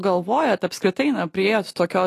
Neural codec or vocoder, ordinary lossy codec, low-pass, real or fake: none; AAC, 48 kbps; 14.4 kHz; real